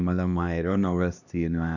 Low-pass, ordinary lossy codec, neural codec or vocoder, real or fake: 7.2 kHz; none; codec, 16 kHz, 1 kbps, X-Codec, HuBERT features, trained on LibriSpeech; fake